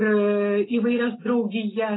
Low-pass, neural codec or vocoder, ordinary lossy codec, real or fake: 7.2 kHz; none; AAC, 16 kbps; real